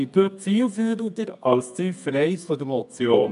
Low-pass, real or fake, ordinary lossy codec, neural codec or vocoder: 10.8 kHz; fake; none; codec, 24 kHz, 0.9 kbps, WavTokenizer, medium music audio release